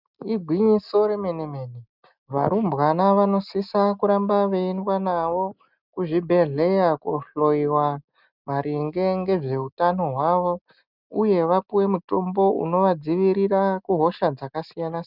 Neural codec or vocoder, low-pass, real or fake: none; 5.4 kHz; real